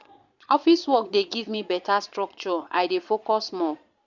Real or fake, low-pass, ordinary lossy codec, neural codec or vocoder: fake; 7.2 kHz; none; vocoder, 22.05 kHz, 80 mel bands, Vocos